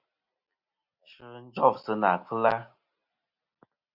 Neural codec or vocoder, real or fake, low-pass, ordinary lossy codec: none; real; 5.4 kHz; Opus, 64 kbps